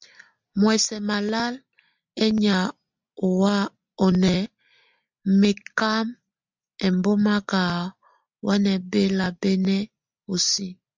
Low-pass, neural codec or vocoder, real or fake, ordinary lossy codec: 7.2 kHz; none; real; MP3, 64 kbps